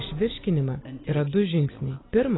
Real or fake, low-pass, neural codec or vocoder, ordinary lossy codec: real; 7.2 kHz; none; AAC, 16 kbps